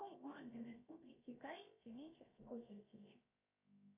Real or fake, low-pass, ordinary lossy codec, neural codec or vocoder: fake; 3.6 kHz; AAC, 32 kbps; codec, 16 kHz, 0.7 kbps, FocalCodec